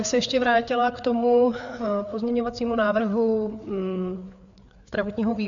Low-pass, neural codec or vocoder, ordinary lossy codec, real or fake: 7.2 kHz; codec, 16 kHz, 4 kbps, FreqCodec, larger model; MP3, 96 kbps; fake